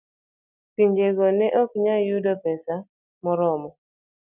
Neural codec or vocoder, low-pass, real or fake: none; 3.6 kHz; real